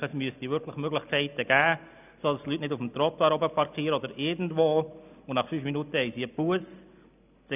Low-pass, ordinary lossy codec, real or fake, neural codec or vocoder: 3.6 kHz; none; real; none